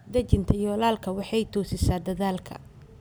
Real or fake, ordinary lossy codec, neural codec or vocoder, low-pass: real; none; none; none